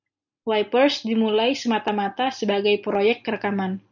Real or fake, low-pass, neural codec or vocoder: real; 7.2 kHz; none